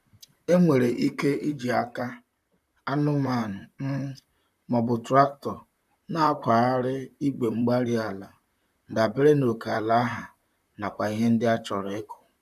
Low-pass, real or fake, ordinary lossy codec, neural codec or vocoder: 14.4 kHz; fake; none; vocoder, 44.1 kHz, 128 mel bands, Pupu-Vocoder